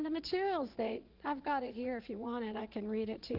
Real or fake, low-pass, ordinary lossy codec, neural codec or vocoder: fake; 5.4 kHz; Opus, 16 kbps; vocoder, 44.1 kHz, 80 mel bands, Vocos